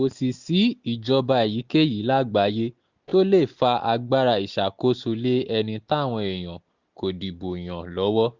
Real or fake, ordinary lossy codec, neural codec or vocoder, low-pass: real; Opus, 64 kbps; none; 7.2 kHz